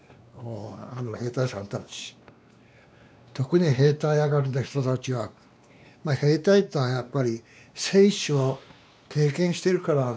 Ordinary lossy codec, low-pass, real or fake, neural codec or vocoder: none; none; fake; codec, 16 kHz, 2 kbps, X-Codec, WavLM features, trained on Multilingual LibriSpeech